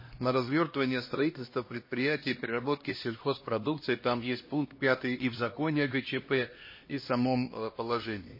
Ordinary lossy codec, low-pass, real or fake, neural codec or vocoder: MP3, 24 kbps; 5.4 kHz; fake; codec, 16 kHz, 1 kbps, X-Codec, HuBERT features, trained on LibriSpeech